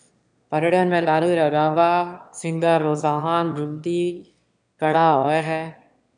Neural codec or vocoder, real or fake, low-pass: autoencoder, 22.05 kHz, a latent of 192 numbers a frame, VITS, trained on one speaker; fake; 9.9 kHz